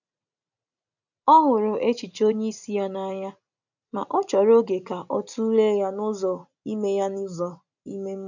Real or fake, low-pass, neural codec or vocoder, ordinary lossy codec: real; 7.2 kHz; none; none